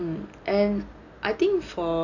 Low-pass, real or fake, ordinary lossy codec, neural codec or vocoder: 7.2 kHz; real; none; none